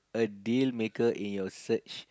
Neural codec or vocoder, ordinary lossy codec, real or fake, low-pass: none; none; real; none